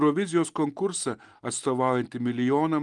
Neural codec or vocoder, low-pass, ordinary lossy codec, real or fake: none; 10.8 kHz; Opus, 24 kbps; real